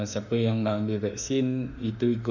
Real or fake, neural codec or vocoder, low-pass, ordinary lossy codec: fake; autoencoder, 48 kHz, 32 numbers a frame, DAC-VAE, trained on Japanese speech; 7.2 kHz; none